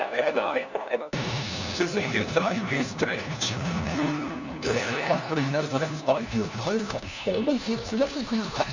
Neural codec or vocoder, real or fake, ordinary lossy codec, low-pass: codec, 16 kHz, 1 kbps, FunCodec, trained on LibriTTS, 50 frames a second; fake; none; 7.2 kHz